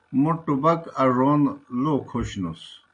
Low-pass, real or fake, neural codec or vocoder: 9.9 kHz; real; none